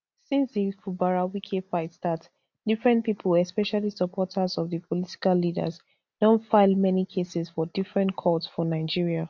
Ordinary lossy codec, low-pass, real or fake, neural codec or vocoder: none; 7.2 kHz; real; none